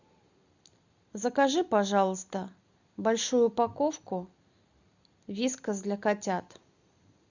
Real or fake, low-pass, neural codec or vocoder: real; 7.2 kHz; none